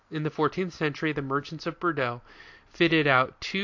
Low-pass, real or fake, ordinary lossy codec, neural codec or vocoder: 7.2 kHz; real; AAC, 48 kbps; none